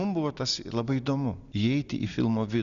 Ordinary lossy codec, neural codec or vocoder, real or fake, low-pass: Opus, 64 kbps; none; real; 7.2 kHz